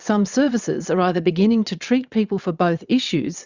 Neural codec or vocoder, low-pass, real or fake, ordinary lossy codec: vocoder, 22.05 kHz, 80 mel bands, WaveNeXt; 7.2 kHz; fake; Opus, 64 kbps